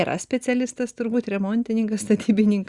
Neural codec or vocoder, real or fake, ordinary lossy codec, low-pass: none; real; Opus, 64 kbps; 10.8 kHz